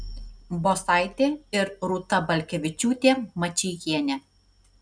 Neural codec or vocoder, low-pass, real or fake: none; 9.9 kHz; real